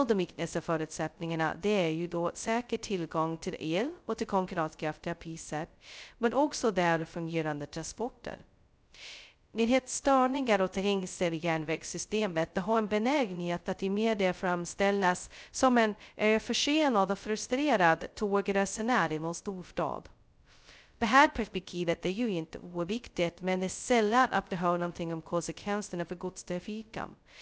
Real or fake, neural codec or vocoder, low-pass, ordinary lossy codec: fake; codec, 16 kHz, 0.2 kbps, FocalCodec; none; none